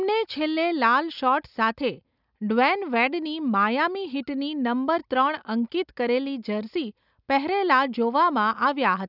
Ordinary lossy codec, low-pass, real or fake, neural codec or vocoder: none; 5.4 kHz; real; none